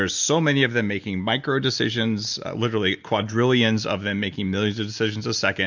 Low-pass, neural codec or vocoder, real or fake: 7.2 kHz; none; real